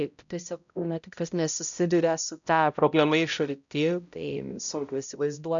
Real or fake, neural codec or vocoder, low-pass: fake; codec, 16 kHz, 0.5 kbps, X-Codec, HuBERT features, trained on balanced general audio; 7.2 kHz